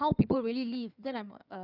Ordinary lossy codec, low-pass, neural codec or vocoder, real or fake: none; 5.4 kHz; codec, 16 kHz in and 24 kHz out, 2.2 kbps, FireRedTTS-2 codec; fake